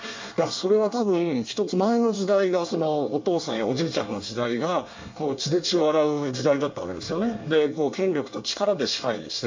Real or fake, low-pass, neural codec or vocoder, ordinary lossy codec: fake; 7.2 kHz; codec, 24 kHz, 1 kbps, SNAC; MP3, 64 kbps